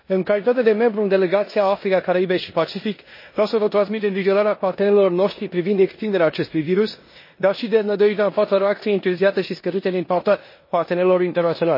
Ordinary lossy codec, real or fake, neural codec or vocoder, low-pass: MP3, 24 kbps; fake; codec, 16 kHz in and 24 kHz out, 0.9 kbps, LongCat-Audio-Codec, four codebook decoder; 5.4 kHz